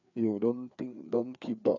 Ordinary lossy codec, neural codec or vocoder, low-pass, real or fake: none; codec, 16 kHz, 4 kbps, FreqCodec, larger model; 7.2 kHz; fake